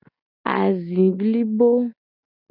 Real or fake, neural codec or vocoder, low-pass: real; none; 5.4 kHz